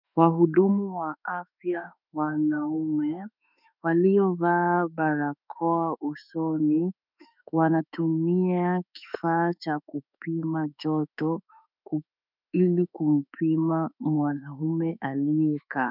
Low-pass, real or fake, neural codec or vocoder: 5.4 kHz; fake; autoencoder, 48 kHz, 32 numbers a frame, DAC-VAE, trained on Japanese speech